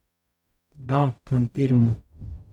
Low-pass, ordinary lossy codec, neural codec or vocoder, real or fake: 19.8 kHz; none; codec, 44.1 kHz, 0.9 kbps, DAC; fake